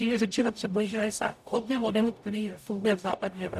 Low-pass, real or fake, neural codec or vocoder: 14.4 kHz; fake; codec, 44.1 kHz, 0.9 kbps, DAC